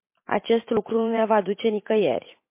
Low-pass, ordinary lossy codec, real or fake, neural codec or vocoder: 3.6 kHz; MP3, 24 kbps; fake; vocoder, 44.1 kHz, 128 mel bands every 512 samples, BigVGAN v2